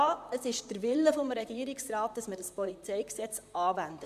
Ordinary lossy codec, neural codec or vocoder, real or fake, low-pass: MP3, 96 kbps; vocoder, 44.1 kHz, 128 mel bands, Pupu-Vocoder; fake; 14.4 kHz